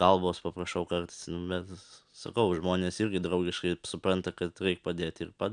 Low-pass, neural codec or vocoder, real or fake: 9.9 kHz; none; real